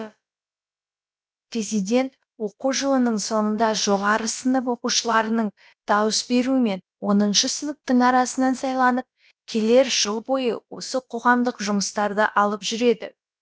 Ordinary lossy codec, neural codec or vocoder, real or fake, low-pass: none; codec, 16 kHz, about 1 kbps, DyCAST, with the encoder's durations; fake; none